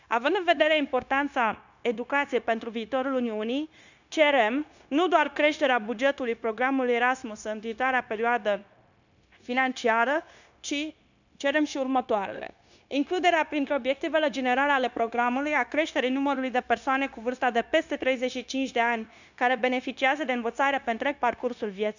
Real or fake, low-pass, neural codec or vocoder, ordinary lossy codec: fake; 7.2 kHz; codec, 16 kHz, 0.9 kbps, LongCat-Audio-Codec; none